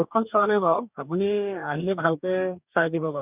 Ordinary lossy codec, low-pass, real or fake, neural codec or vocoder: none; 3.6 kHz; fake; codec, 44.1 kHz, 2.6 kbps, DAC